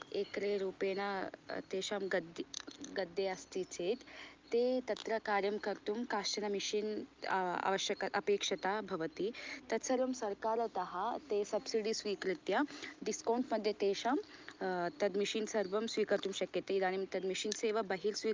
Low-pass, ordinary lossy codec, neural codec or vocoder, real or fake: 7.2 kHz; Opus, 32 kbps; none; real